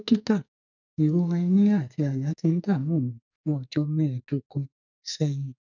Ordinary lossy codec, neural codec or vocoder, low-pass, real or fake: none; codec, 44.1 kHz, 2.6 kbps, SNAC; 7.2 kHz; fake